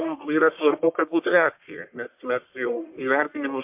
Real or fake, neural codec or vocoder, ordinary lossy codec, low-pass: fake; codec, 44.1 kHz, 1.7 kbps, Pupu-Codec; MP3, 32 kbps; 3.6 kHz